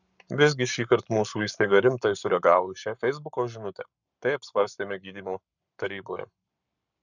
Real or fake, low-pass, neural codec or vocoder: fake; 7.2 kHz; codec, 44.1 kHz, 7.8 kbps, Pupu-Codec